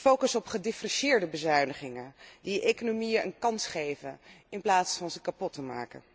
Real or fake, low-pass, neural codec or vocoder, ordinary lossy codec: real; none; none; none